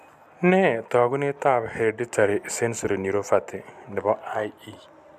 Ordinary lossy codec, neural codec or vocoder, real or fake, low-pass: none; none; real; 14.4 kHz